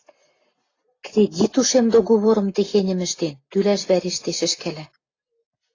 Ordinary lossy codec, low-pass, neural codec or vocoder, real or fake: AAC, 32 kbps; 7.2 kHz; none; real